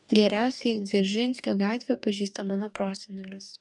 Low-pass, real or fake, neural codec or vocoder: 10.8 kHz; fake; codec, 44.1 kHz, 2.6 kbps, DAC